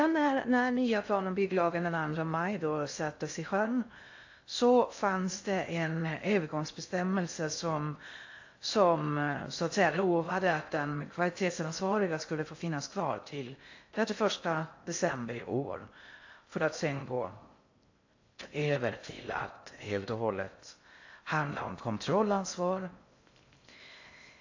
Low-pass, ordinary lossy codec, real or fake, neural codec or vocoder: 7.2 kHz; AAC, 48 kbps; fake; codec, 16 kHz in and 24 kHz out, 0.6 kbps, FocalCodec, streaming, 2048 codes